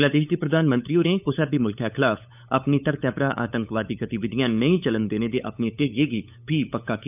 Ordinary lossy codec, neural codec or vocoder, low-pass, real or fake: none; codec, 16 kHz, 8 kbps, FunCodec, trained on LibriTTS, 25 frames a second; 3.6 kHz; fake